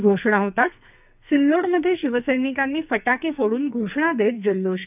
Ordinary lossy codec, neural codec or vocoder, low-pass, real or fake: none; codec, 44.1 kHz, 2.6 kbps, SNAC; 3.6 kHz; fake